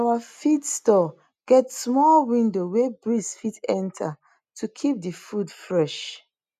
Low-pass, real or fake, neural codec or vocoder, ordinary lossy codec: 14.4 kHz; real; none; none